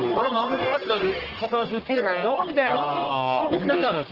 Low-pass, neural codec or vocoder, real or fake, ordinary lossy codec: 5.4 kHz; codec, 44.1 kHz, 1.7 kbps, Pupu-Codec; fake; Opus, 16 kbps